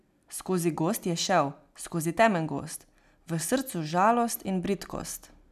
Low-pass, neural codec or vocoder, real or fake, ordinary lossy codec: 14.4 kHz; none; real; none